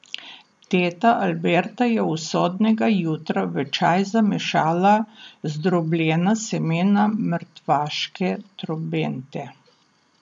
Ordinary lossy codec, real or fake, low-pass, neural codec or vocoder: none; real; 9.9 kHz; none